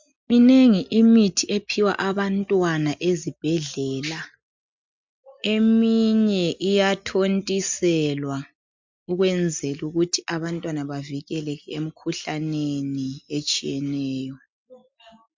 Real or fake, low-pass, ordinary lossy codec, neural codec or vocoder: real; 7.2 kHz; MP3, 64 kbps; none